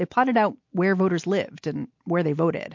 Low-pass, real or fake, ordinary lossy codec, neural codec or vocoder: 7.2 kHz; real; MP3, 48 kbps; none